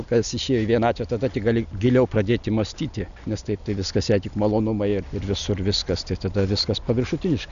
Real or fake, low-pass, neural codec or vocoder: real; 7.2 kHz; none